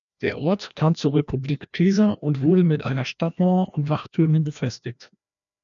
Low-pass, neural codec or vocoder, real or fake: 7.2 kHz; codec, 16 kHz, 1 kbps, FreqCodec, larger model; fake